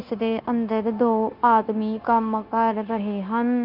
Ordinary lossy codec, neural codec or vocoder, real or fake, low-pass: Opus, 24 kbps; codec, 16 kHz, 0.9 kbps, LongCat-Audio-Codec; fake; 5.4 kHz